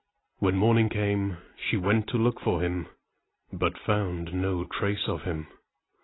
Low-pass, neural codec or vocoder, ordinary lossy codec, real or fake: 7.2 kHz; none; AAC, 16 kbps; real